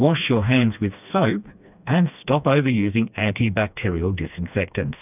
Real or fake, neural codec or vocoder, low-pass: fake; codec, 16 kHz, 2 kbps, FreqCodec, smaller model; 3.6 kHz